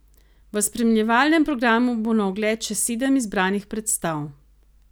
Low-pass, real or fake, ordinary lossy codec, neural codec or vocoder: none; real; none; none